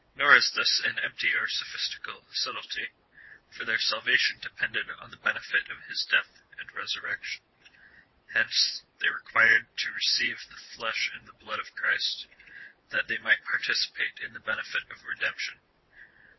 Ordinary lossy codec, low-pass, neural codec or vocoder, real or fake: MP3, 24 kbps; 7.2 kHz; vocoder, 22.05 kHz, 80 mel bands, WaveNeXt; fake